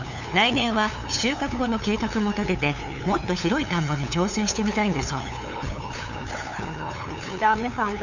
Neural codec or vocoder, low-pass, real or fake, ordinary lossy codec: codec, 16 kHz, 8 kbps, FunCodec, trained on LibriTTS, 25 frames a second; 7.2 kHz; fake; none